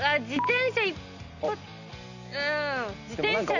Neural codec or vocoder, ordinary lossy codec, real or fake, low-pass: none; none; real; 7.2 kHz